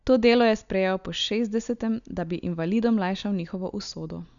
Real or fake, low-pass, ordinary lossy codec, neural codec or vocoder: real; 7.2 kHz; none; none